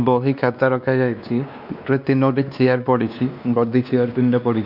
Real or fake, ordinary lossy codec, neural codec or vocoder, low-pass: fake; none; codec, 16 kHz, 2 kbps, X-Codec, WavLM features, trained on Multilingual LibriSpeech; 5.4 kHz